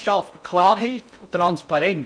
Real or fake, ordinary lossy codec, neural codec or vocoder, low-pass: fake; none; codec, 16 kHz in and 24 kHz out, 0.6 kbps, FocalCodec, streaming, 2048 codes; 9.9 kHz